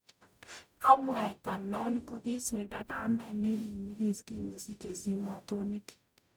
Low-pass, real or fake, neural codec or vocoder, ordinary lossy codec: none; fake; codec, 44.1 kHz, 0.9 kbps, DAC; none